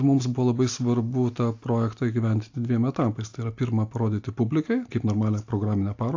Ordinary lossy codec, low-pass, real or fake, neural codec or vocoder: AAC, 48 kbps; 7.2 kHz; real; none